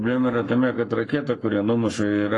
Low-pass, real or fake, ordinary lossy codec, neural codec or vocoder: 10.8 kHz; fake; AAC, 32 kbps; codec, 44.1 kHz, 7.8 kbps, Pupu-Codec